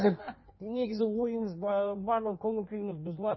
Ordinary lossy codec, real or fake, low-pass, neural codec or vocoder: MP3, 24 kbps; fake; 7.2 kHz; codec, 16 kHz in and 24 kHz out, 1.1 kbps, FireRedTTS-2 codec